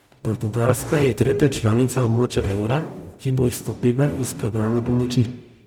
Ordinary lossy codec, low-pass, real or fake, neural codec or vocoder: none; 19.8 kHz; fake; codec, 44.1 kHz, 0.9 kbps, DAC